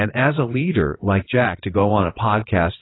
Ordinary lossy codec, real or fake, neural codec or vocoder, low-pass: AAC, 16 kbps; fake; vocoder, 22.05 kHz, 80 mel bands, WaveNeXt; 7.2 kHz